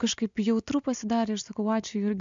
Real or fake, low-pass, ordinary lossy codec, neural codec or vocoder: real; 7.2 kHz; MP3, 64 kbps; none